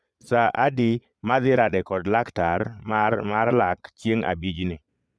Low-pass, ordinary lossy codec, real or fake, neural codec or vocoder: none; none; fake; vocoder, 22.05 kHz, 80 mel bands, Vocos